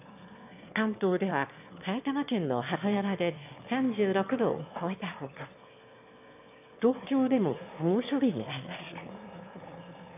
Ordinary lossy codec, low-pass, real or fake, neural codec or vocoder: AAC, 32 kbps; 3.6 kHz; fake; autoencoder, 22.05 kHz, a latent of 192 numbers a frame, VITS, trained on one speaker